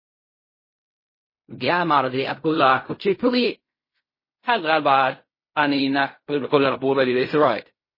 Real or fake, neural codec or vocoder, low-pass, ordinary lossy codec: fake; codec, 16 kHz in and 24 kHz out, 0.4 kbps, LongCat-Audio-Codec, fine tuned four codebook decoder; 5.4 kHz; MP3, 24 kbps